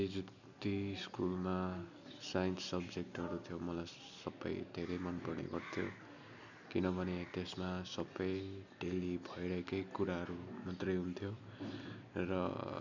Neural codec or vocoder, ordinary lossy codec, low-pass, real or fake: none; none; 7.2 kHz; real